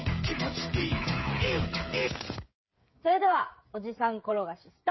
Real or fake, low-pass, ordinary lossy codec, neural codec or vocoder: fake; 7.2 kHz; MP3, 24 kbps; codec, 16 kHz, 8 kbps, FreqCodec, smaller model